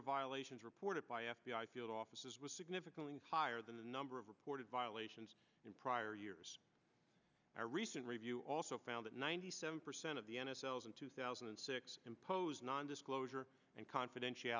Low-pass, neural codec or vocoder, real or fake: 7.2 kHz; none; real